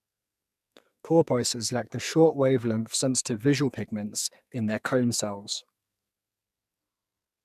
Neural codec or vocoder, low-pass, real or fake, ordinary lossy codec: codec, 44.1 kHz, 2.6 kbps, SNAC; 14.4 kHz; fake; none